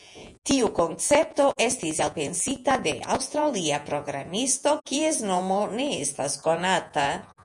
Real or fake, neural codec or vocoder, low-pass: fake; vocoder, 48 kHz, 128 mel bands, Vocos; 10.8 kHz